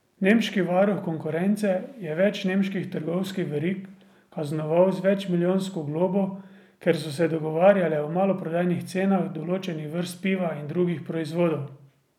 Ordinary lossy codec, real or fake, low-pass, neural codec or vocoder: none; real; 19.8 kHz; none